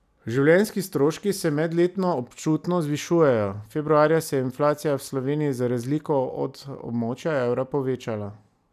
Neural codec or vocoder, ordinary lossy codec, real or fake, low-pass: none; none; real; 14.4 kHz